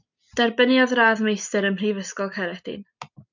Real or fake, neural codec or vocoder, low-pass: real; none; 7.2 kHz